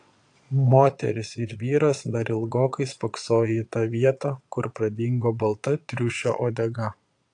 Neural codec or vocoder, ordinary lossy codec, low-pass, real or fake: vocoder, 22.05 kHz, 80 mel bands, Vocos; AAC, 64 kbps; 9.9 kHz; fake